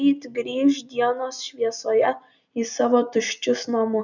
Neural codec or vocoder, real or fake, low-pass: none; real; 7.2 kHz